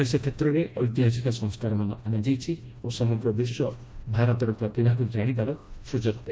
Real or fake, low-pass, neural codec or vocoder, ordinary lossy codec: fake; none; codec, 16 kHz, 1 kbps, FreqCodec, smaller model; none